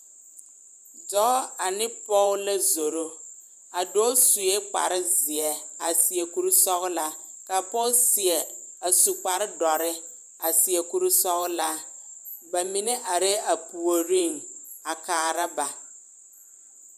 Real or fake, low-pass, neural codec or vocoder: fake; 14.4 kHz; vocoder, 44.1 kHz, 128 mel bands every 512 samples, BigVGAN v2